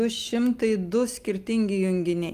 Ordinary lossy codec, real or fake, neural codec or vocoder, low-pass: Opus, 32 kbps; real; none; 14.4 kHz